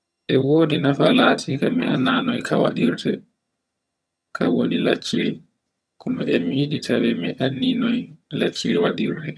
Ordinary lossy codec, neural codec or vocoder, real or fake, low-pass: none; vocoder, 22.05 kHz, 80 mel bands, HiFi-GAN; fake; none